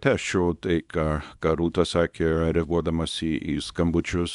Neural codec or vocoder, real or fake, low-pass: codec, 24 kHz, 0.9 kbps, WavTokenizer, small release; fake; 10.8 kHz